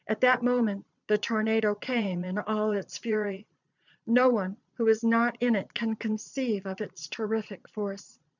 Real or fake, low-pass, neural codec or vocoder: fake; 7.2 kHz; vocoder, 22.05 kHz, 80 mel bands, Vocos